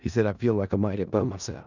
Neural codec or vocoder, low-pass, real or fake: codec, 16 kHz in and 24 kHz out, 0.4 kbps, LongCat-Audio-Codec, four codebook decoder; 7.2 kHz; fake